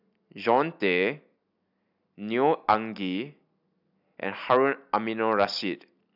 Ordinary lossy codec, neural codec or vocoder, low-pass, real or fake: none; none; 5.4 kHz; real